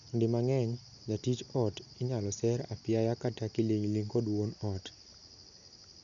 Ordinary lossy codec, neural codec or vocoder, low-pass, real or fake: none; none; 7.2 kHz; real